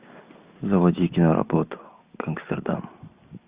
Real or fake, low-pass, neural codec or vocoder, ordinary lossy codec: real; 3.6 kHz; none; Opus, 64 kbps